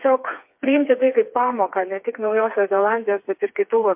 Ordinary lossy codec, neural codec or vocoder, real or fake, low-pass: MP3, 32 kbps; codec, 16 kHz, 4 kbps, FreqCodec, smaller model; fake; 3.6 kHz